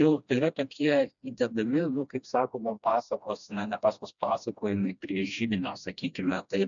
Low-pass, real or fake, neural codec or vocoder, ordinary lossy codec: 7.2 kHz; fake; codec, 16 kHz, 1 kbps, FreqCodec, smaller model; MP3, 96 kbps